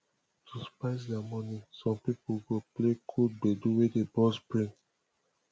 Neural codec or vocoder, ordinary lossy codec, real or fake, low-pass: none; none; real; none